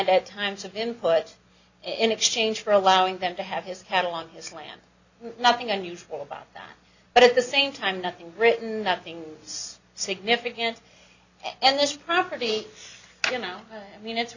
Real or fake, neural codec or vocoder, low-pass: real; none; 7.2 kHz